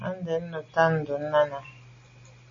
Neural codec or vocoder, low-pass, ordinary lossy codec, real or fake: none; 7.2 kHz; MP3, 32 kbps; real